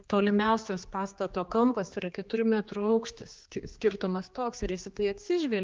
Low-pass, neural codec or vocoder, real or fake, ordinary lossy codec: 7.2 kHz; codec, 16 kHz, 2 kbps, X-Codec, HuBERT features, trained on general audio; fake; Opus, 24 kbps